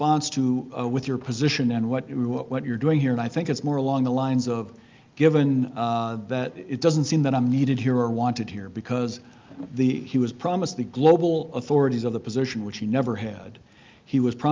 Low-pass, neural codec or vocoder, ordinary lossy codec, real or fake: 7.2 kHz; none; Opus, 24 kbps; real